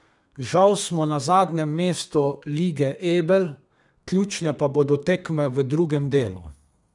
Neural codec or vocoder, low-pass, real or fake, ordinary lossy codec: codec, 32 kHz, 1.9 kbps, SNAC; 10.8 kHz; fake; none